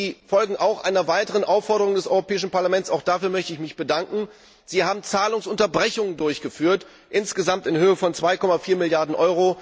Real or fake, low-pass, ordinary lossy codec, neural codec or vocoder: real; none; none; none